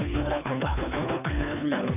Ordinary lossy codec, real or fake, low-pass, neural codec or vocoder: none; fake; 3.6 kHz; codec, 24 kHz, 3 kbps, HILCodec